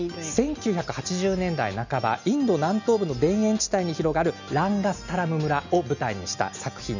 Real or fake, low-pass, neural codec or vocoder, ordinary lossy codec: real; 7.2 kHz; none; none